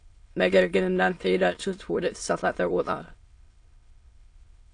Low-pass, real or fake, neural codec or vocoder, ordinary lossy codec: 9.9 kHz; fake; autoencoder, 22.05 kHz, a latent of 192 numbers a frame, VITS, trained on many speakers; AAC, 48 kbps